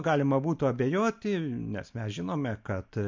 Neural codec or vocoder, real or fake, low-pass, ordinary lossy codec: none; real; 7.2 kHz; MP3, 48 kbps